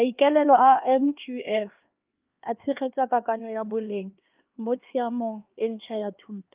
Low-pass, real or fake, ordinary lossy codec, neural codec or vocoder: 3.6 kHz; fake; Opus, 24 kbps; codec, 16 kHz, 2 kbps, X-Codec, HuBERT features, trained on LibriSpeech